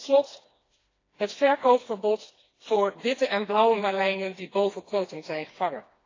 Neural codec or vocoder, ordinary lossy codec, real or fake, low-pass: codec, 16 kHz, 2 kbps, FreqCodec, smaller model; AAC, 32 kbps; fake; 7.2 kHz